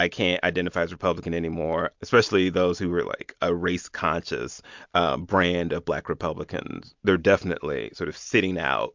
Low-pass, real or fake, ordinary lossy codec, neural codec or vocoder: 7.2 kHz; real; MP3, 64 kbps; none